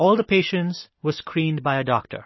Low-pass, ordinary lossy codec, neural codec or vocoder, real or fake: 7.2 kHz; MP3, 24 kbps; none; real